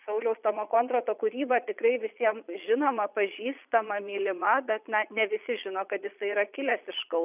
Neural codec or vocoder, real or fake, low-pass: vocoder, 22.05 kHz, 80 mel bands, Vocos; fake; 3.6 kHz